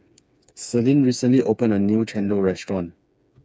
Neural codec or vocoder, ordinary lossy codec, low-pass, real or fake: codec, 16 kHz, 4 kbps, FreqCodec, smaller model; none; none; fake